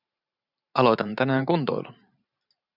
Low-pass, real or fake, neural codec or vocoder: 5.4 kHz; fake; vocoder, 44.1 kHz, 128 mel bands every 512 samples, BigVGAN v2